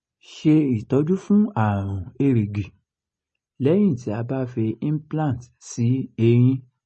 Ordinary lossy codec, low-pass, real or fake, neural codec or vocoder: MP3, 32 kbps; 9.9 kHz; real; none